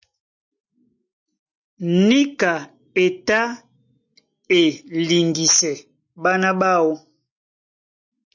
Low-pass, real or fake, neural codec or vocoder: 7.2 kHz; real; none